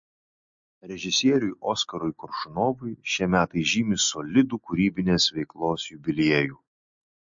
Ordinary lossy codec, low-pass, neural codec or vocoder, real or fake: MP3, 48 kbps; 7.2 kHz; none; real